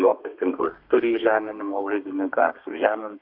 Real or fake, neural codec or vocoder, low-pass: fake; codec, 32 kHz, 1.9 kbps, SNAC; 5.4 kHz